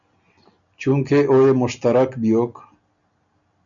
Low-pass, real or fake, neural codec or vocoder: 7.2 kHz; real; none